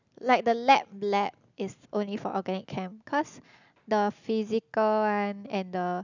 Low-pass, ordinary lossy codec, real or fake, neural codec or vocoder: 7.2 kHz; none; real; none